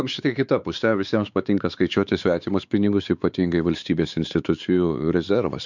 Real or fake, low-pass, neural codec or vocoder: fake; 7.2 kHz; codec, 16 kHz, 4 kbps, X-Codec, WavLM features, trained on Multilingual LibriSpeech